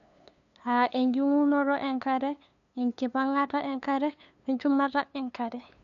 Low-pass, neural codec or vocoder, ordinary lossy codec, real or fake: 7.2 kHz; codec, 16 kHz, 2 kbps, FunCodec, trained on LibriTTS, 25 frames a second; none; fake